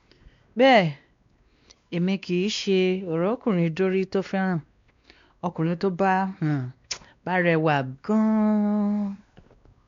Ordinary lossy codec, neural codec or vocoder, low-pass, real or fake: MP3, 64 kbps; codec, 16 kHz, 2 kbps, X-Codec, WavLM features, trained on Multilingual LibriSpeech; 7.2 kHz; fake